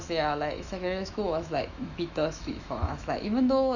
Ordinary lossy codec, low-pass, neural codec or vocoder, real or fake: none; 7.2 kHz; autoencoder, 48 kHz, 128 numbers a frame, DAC-VAE, trained on Japanese speech; fake